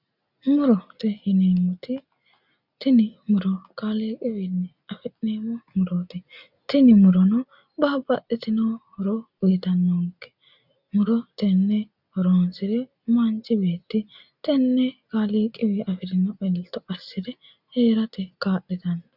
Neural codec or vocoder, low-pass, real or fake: none; 5.4 kHz; real